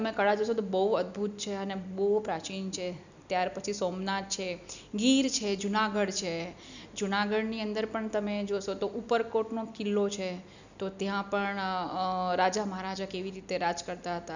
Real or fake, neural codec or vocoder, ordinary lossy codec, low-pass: real; none; none; 7.2 kHz